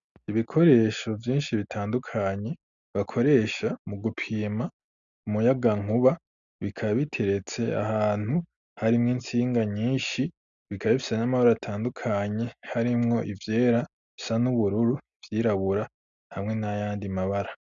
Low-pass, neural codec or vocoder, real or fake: 7.2 kHz; none; real